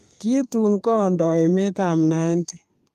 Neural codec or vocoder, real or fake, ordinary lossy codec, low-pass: codec, 32 kHz, 1.9 kbps, SNAC; fake; Opus, 32 kbps; 14.4 kHz